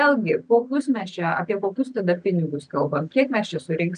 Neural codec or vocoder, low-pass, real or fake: none; 9.9 kHz; real